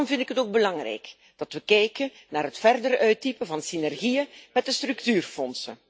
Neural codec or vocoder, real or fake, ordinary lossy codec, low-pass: none; real; none; none